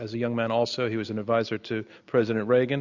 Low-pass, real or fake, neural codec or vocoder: 7.2 kHz; real; none